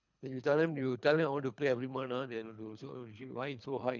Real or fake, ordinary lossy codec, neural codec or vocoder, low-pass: fake; none; codec, 24 kHz, 3 kbps, HILCodec; 7.2 kHz